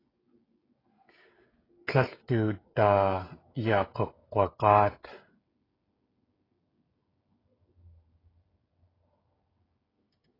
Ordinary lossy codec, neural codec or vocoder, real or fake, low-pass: AAC, 24 kbps; codec, 16 kHz, 16 kbps, FreqCodec, smaller model; fake; 5.4 kHz